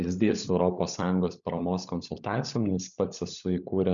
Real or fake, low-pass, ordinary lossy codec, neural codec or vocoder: fake; 7.2 kHz; MP3, 96 kbps; codec, 16 kHz, 16 kbps, FunCodec, trained on LibriTTS, 50 frames a second